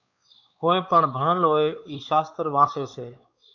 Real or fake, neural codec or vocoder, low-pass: fake; codec, 16 kHz, 4 kbps, X-Codec, WavLM features, trained on Multilingual LibriSpeech; 7.2 kHz